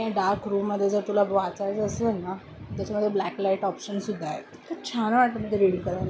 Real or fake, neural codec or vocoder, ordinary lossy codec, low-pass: real; none; none; none